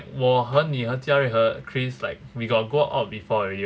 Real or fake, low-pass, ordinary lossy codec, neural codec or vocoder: real; none; none; none